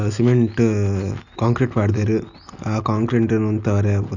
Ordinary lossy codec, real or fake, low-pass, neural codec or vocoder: none; real; 7.2 kHz; none